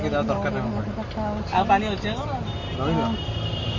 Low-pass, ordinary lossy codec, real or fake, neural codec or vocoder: 7.2 kHz; MP3, 32 kbps; real; none